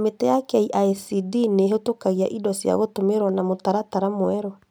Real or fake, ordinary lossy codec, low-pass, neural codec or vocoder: real; none; none; none